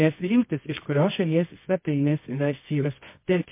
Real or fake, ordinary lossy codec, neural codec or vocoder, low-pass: fake; MP3, 24 kbps; codec, 24 kHz, 0.9 kbps, WavTokenizer, medium music audio release; 3.6 kHz